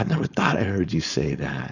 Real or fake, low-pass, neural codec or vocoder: fake; 7.2 kHz; codec, 16 kHz, 4.8 kbps, FACodec